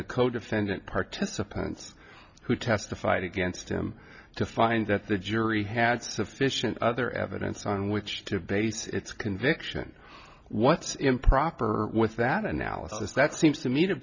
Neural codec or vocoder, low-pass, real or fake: none; 7.2 kHz; real